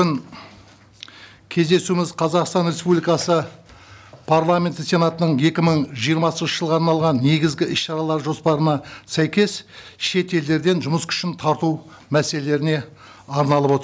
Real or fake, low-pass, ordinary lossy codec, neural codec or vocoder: real; none; none; none